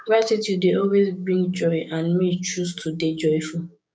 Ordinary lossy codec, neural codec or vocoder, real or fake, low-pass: none; codec, 16 kHz, 6 kbps, DAC; fake; none